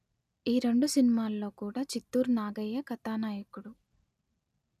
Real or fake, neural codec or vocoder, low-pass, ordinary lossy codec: real; none; 14.4 kHz; none